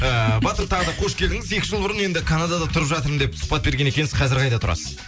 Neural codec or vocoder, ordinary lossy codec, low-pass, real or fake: none; none; none; real